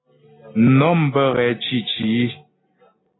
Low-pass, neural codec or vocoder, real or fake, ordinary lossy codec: 7.2 kHz; none; real; AAC, 16 kbps